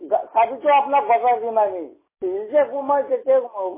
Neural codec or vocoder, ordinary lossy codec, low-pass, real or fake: none; MP3, 16 kbps; 3.6 kHz; real